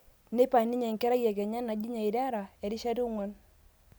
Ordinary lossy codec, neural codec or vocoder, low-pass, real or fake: none; none; none; real